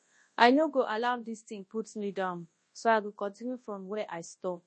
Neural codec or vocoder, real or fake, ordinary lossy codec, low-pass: codec, 24 kHz, 0.9 kbps, WavTokenizer, large speech release; fake; MP3, 32 kbps; 10.8 kHz